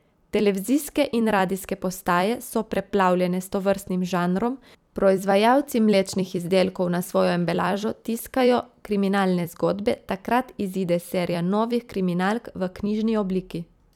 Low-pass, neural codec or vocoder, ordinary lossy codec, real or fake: 19.8 kHz; vocoder, 44.1 kHz, 128 mel bands every 256 samples, BigVGAN v2; none; fake